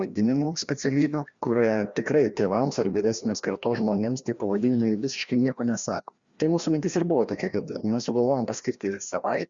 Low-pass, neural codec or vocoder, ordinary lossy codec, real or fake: 7.2 kHz; codec, 16 kHz, 1 kbps, FreqCodec, larger model; Opus, 64 kbps; fake